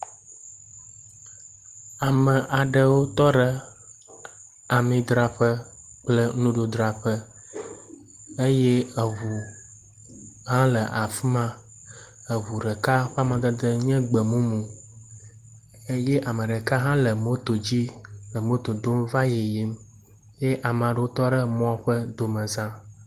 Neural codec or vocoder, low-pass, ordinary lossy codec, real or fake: none; 14.4 kHz; Opus, 24 kbps; real